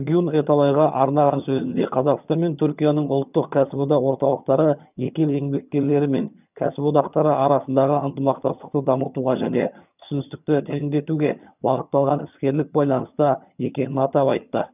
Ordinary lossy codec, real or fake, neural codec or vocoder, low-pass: none; fake; vocoder, 22.05 kHz, 80 mel bands, HiFi-GAN; 3.6 kHz